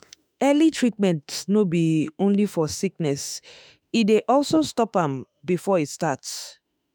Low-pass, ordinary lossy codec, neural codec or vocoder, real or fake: none; none; autoencoder, 48 kHz, 32 numbers a frame, DAC-VAE, trained on Japanese speech; fake